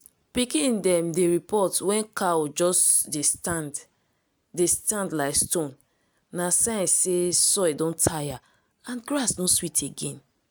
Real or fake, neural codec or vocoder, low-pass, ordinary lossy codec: real; none; none; none